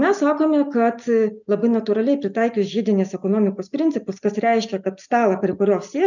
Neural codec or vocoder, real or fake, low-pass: none; real; 7.2 kHz